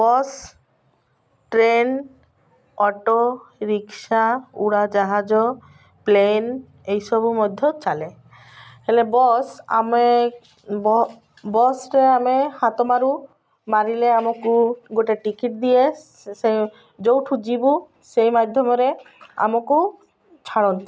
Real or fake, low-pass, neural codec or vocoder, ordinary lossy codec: real; none; none; none